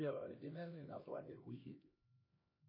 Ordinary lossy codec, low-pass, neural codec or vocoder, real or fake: MP3, 24 kbps; 5.4 kHz; codec, 16 kHz, 1 kbps, X-Codec, HuBERT features, trained on LibriSpeech; fake